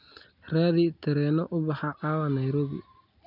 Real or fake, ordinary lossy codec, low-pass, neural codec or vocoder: real; none; 5.4 kHz; none